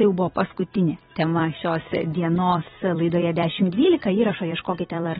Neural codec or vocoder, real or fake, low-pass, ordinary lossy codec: vocoder, 44.1 kHz, 128 mel bands every 256 samples, BigVGAN v2; fake; 19.8 kHz; AAC, 16 kbps